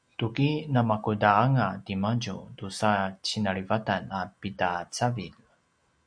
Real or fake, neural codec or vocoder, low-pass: real; none; 9.9 kHz